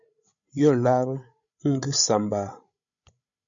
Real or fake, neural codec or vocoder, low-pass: fake; codec, 16 kHz, 16 kbps, FreqCodec, larger model; 7.2 kHz